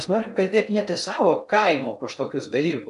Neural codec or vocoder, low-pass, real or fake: codec, 16 kHz in and 24 kHz out, 0.6 kbps, FocalCodec, streaming, 4096 codes; 10.8 kHz; fake